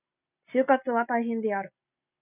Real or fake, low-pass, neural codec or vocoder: real; 3.6 kHz; none